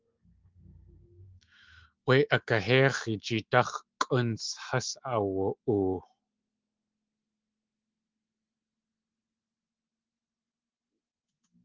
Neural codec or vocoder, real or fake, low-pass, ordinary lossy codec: autoencoder, 48 kHz, 128 numbers a frame, DAC-VAE, trained on Japanese speech; fake; 7.2 kHz; Opus, 32 kbps